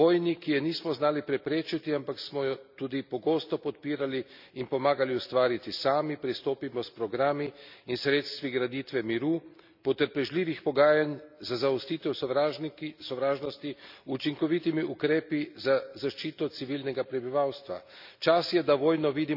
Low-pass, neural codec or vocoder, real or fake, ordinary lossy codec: 5.4 kHz; none; real; none